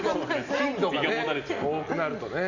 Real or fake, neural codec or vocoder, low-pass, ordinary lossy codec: real; none; 7.2 kHz; none